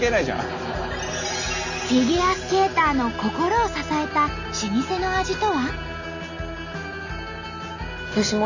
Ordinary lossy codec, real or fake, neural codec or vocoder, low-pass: none; real; none; 7.2 kHz